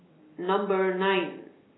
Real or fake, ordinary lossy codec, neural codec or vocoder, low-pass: real; AAC, 16 kbps; none; 7.2 kHz